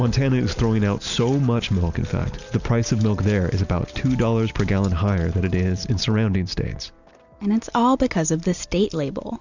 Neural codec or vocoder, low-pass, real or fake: none; 7.2 kHz; real